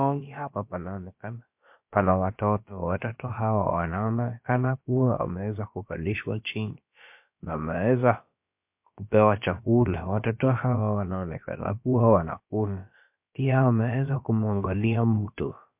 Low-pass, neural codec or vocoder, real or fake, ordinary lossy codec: 3.6 kHz; codec, 16 kHz, about 1 kbps, DyCAST, with the encoder's durations; fake; MP3, 32 kbps